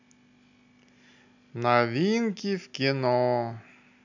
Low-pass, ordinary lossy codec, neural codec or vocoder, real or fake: 7.2 kHz; none; none; real